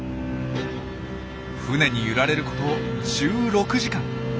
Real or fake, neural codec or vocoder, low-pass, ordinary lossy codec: real; none; none; none